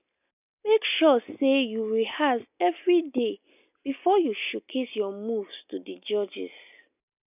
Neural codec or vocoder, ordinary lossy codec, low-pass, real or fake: none; none; 3.6 kHz; real